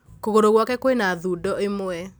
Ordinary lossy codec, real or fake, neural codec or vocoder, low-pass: none; real; none; none